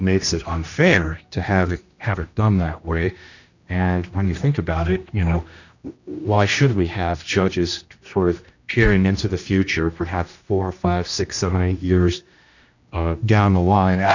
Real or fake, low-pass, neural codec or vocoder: fake; 7.2 kHz; codec, 16 kHz, 1 kbps, X-Codec, HuBERT features, trained on general audio